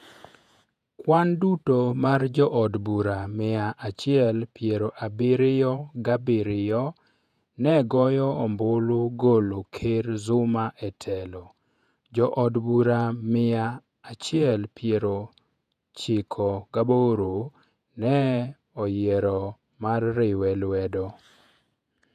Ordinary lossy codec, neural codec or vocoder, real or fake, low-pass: none; vocoder, 48 kHz, 128 mel bands, Vocos; fake; 14.4 kHz